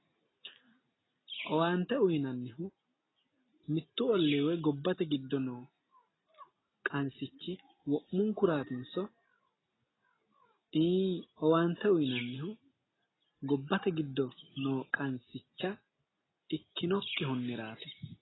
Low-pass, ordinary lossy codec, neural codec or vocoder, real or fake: 7.2 kHz; AAC, 16 kbps; none; real